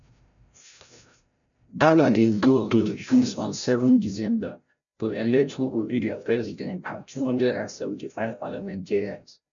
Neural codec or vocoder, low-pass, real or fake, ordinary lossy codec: codec, 16 kHz, 0.5 kbps, FreqCodec, larger model; 7.2 kHz; fake; none